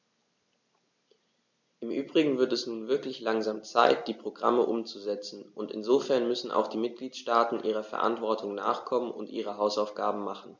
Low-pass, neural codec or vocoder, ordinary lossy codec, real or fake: 7.2 kHz; none; none; real